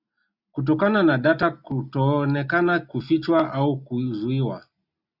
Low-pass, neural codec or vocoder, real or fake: 5.4 kHz; none; real